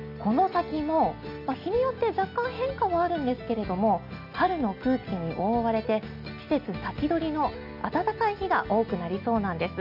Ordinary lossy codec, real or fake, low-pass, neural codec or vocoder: none; real; 5.4 kHz; none